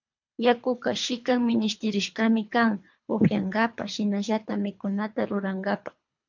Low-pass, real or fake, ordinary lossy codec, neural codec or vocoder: 7.2 kHz; fake; MP3, 64 kbps; codec, 24 kHz, 3 kbps, HILCodec